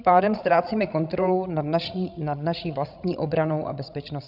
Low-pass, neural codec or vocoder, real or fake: 5.4 kHz; codec, 16 kHz, 16 kbps, FreqCodec, larger model; fake